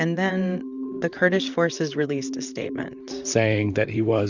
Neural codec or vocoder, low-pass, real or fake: vocoder, 44.1 kHz, 128 mel bands, Pupu-Vocoder; 7.2 kHz; fake